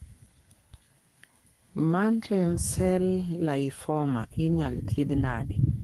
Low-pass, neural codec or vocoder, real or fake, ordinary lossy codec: 14.4 kHz; codec, 32 kHz, 1.9 kbps, SNAC; fake; Opus, 24 kbps